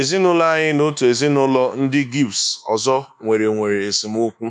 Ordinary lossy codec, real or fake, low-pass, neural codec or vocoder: none; fake; 10.8 kHz; codec, 24 kHz, 1.2 kbps, DualCodec